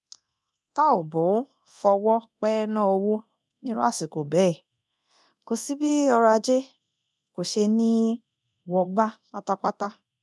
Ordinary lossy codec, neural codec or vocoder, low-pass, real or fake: none; codec, 24 kHz, 0.9 kbps, DualCodec; none; fake